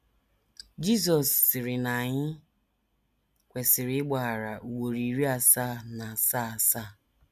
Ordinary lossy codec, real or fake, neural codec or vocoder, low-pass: none; real; none; 14.4 kHz